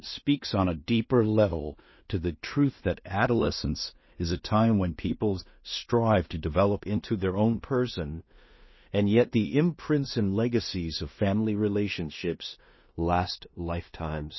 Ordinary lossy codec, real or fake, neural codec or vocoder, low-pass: MP3, 24 kbps; fake; codec, 16 kHz in and 24 kHz out, 0.4 kbps, LongCat-Audio-Codec, two codebook decoder; 7.2 kHz